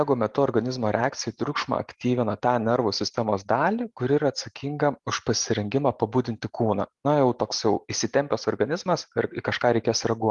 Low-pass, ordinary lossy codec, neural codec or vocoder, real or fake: 10.8 kHz; Opus, 24 kbps; none; real